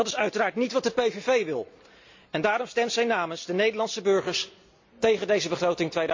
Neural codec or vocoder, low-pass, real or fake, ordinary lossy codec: none; 7.2 kHz; real; MP3, 64 kbps